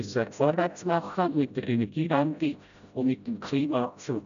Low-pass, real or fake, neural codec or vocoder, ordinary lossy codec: 7.2 kHz; fake; codec, 16 kHz, 0.5 kbps, FreqCodec, smaller model; none